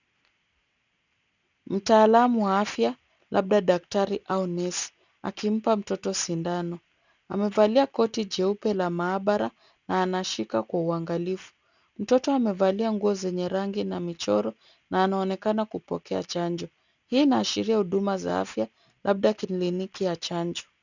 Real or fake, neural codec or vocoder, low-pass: real; none; 7.2 kHz